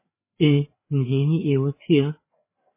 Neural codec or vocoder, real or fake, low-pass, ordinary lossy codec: codec, 16 kHz, 4 kbps, FreqCodec, larger model; fake; 3.6 kHz; MP3, 16 kbps